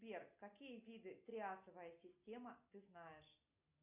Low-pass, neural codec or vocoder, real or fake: 3.6 kHz; none; real